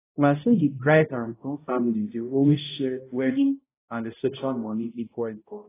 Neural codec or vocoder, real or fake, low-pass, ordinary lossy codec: codec, 16 kHz, 0.5 kbps, X-Codec, HuBERT features, trained on balanced general audio; fake; 3.6 kHz; AAC, 16 kbps